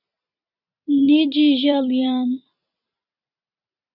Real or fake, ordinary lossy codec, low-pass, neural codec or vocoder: fake; Opus, 64 kbps; 5.4 kHz; vocoder, 44.1 kHz, 128 mel bands every 256 samples, BigVGAN v2